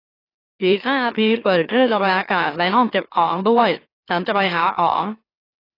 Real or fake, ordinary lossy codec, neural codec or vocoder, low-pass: fake; AAC, 24 kbps; autoencoder, 44.1 kHz, a latent of 192 numbers a frame, MeloTTS; 5.4 kHz